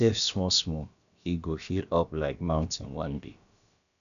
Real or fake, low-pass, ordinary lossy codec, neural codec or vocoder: fake; 7.2 kHz; none; codec, 16 kHz, about 1 kbps, DyCAST, with the encoder's durations